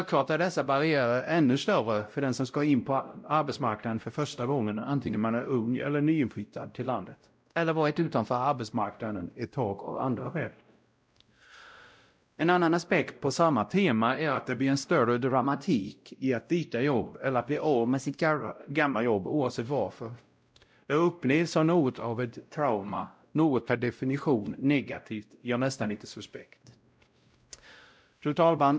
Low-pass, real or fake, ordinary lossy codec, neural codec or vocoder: none; fake; none; codec, 16 kHz, 0.5 kbps, X-Codec, WavLM features, trained on Multilingual LibriSpeech